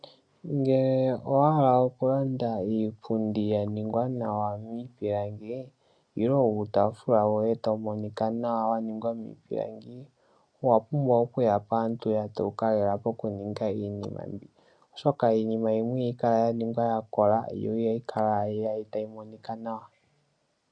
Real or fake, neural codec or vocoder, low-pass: real; none; 9.9 kHz